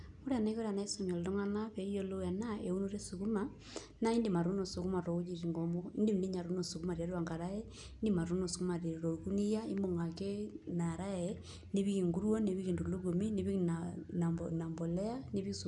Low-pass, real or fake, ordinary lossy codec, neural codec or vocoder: 10.8 kHz; real; none; none